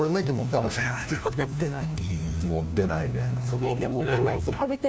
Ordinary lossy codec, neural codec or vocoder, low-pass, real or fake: none; codec, 16 kHz, 1 kbps, FunCodec, trained on LibriTTS, 50 frames a second; none; fake